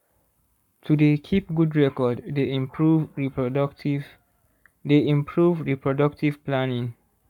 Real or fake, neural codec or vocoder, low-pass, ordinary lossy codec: fake; codec, 44.1 kHz, 7.8 kbps, Pupu-Codec; 19.8 kHz; none